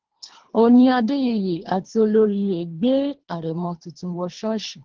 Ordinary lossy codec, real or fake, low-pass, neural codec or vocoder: Opus, 16 kbps; fake; 7.2 kHz; codec, 24 kHz, 3 kbps, HILCodec